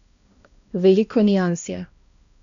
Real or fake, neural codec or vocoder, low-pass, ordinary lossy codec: fake; codec, 16 kHz, 1 kbps, X-Codec, HuBERT features, trained on balanced general audio; 7.2 kHz; none